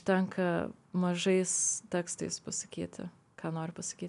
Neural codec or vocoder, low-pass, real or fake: none; 10.8 kHz; real